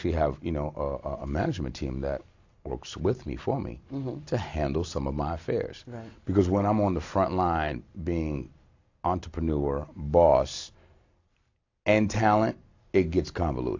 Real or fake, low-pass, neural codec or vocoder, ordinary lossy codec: real; 7.2 kHz; none; AAC, 48 kbps